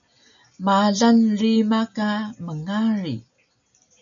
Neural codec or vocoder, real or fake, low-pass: none; real; 7.2 kHz